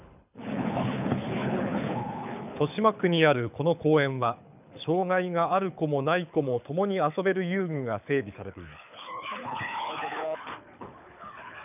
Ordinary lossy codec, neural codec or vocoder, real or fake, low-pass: none; codec, 24 kHz, 6 kbps, HILCodec; fake; 3.6 kHz